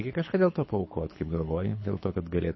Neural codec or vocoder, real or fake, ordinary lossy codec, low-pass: codec, 24 kHz, 6 kbps, HILCodec; fake; MP3, 24 kbps; 7.2 kHz